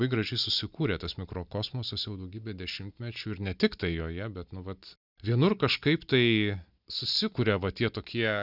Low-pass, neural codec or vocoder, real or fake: 5.4 kHz; none; real